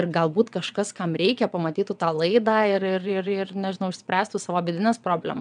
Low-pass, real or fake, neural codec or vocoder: 9.9 kHz; fake; vocoder, 22.05 kHz, 80 mel bands, WaveNeXt